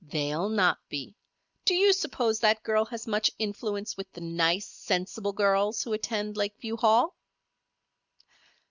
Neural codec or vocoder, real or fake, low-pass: none; real; 7.2 kHz